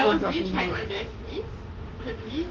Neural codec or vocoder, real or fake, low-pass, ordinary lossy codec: autoencoder, 48 kHz, 32 numbers a frame, DAC-VAE, trained on Japanese speech; fake; 7.2 kHz; Opus, 16 kbps